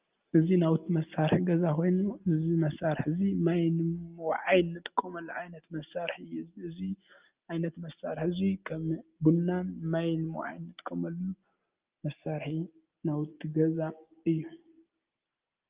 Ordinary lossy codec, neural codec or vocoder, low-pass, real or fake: Opus, 24 kbps; none; 3.6 kHz; real